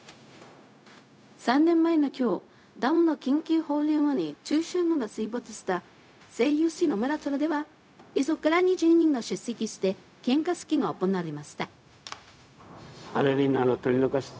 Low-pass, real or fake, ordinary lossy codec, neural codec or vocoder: none; fake; none; codec, 16 kHz, 0.4 kbps, LongCat-Audio-Codec